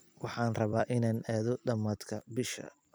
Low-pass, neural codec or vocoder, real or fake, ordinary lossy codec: none; vocoder, 44.1 kHz, 128 mel bands every 256 samples, BigVGAN v2; fake; none